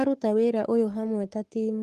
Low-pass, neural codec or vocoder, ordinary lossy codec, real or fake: 14.4 kHz; codec, 44.1 kHz, 7.8 kbps, Pupu-Codec; Opus, 24 kbps; fake